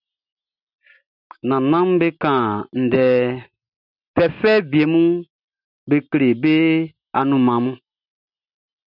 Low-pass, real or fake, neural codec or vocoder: 5.4 kHz; real; none